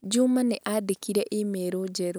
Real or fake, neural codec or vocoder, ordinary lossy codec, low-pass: real; none; none; none